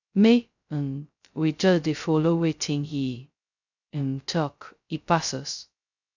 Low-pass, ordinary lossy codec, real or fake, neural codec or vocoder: 7.2 kHz; none; fake; codec, 16 kHz, 0.2 kbps, FocalCodec